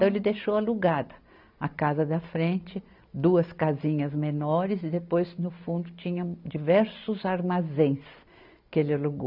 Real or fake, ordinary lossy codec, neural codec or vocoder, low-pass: real; none; none; 5.4 kHz